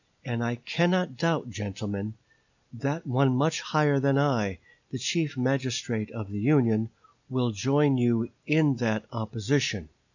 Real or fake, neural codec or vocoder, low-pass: real; none; 7.2 kHz